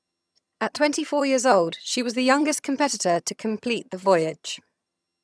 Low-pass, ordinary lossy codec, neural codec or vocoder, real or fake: none; none; vocoder, 22.05 kHz, 80 mel bands, HiFi-GAN; fake